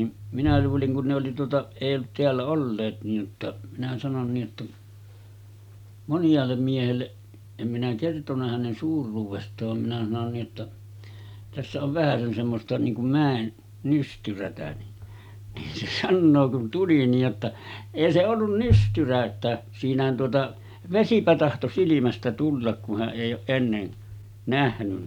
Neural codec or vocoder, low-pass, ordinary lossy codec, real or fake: none; 19.8 kHz; none; real